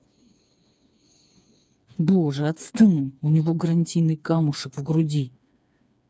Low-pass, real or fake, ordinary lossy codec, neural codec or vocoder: none; fake; none; codec, 16 kHz, 4 kbps, FreqCodec, smaller model